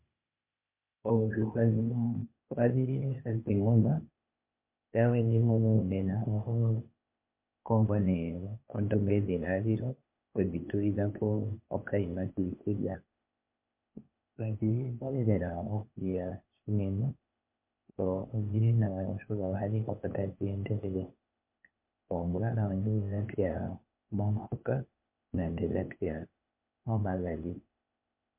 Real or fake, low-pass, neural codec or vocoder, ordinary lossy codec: fake; 3.6 kHz; codec, 16 kHz, 0.8 kbps, ZipCodec; MP3, 32 kbps